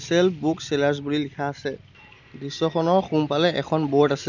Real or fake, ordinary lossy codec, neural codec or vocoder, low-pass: real; none; none; 7.2 kHz